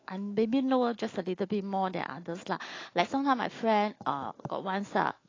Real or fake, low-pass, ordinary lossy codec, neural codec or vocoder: fake; 7.2 kHz; AAC, 32 kbps; autoencoder, 48 kHz, 128 numbers a frame, DAC-VAE, trained on Japanese speech